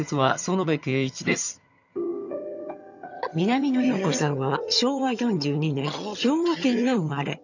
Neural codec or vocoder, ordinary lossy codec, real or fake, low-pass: vocoder, 22.05 kHz, 80 mel bands, HiFi-GAN; none; fake; 7.2 kHz